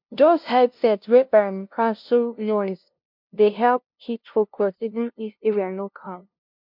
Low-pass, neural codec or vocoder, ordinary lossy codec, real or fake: 5.4 kHz; codec, 16 kHz, 0.5 kbps, FunCodec, trained on LibriTTS, 25 frames a second; MP3, 48 kbps; fake